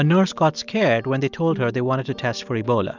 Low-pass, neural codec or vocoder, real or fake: 7.2 kHz; none; real